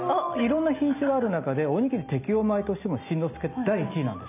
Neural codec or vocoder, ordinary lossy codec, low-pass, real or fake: none; none; 3.6 kHz; real